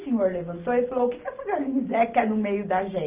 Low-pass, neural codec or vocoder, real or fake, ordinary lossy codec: 3.6 kHz; none; real; Opus, 32 kbps